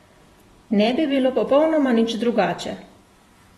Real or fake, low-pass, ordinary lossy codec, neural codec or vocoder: fake; 19.8 kHz; AAC, 32 kbps; vocoder, 48 kHz, 128 mel bands, Vocos